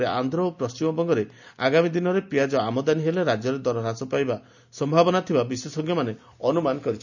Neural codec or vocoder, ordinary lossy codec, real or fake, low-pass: none; none; real; 7.2 kHz